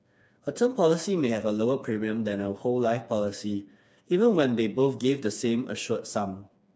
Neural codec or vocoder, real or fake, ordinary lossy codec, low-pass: codec, 16 kHz, 4 kbps, FreqCodec, smaller model; fake; none; none